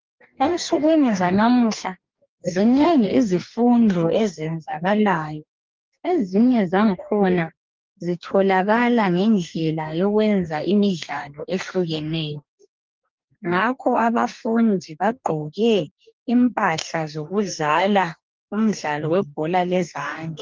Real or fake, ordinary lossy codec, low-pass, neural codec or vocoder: fake; Opus, 32 kbps; 7.2 kHz; codec, 44.1 kHz, 2.6 kbps, DAC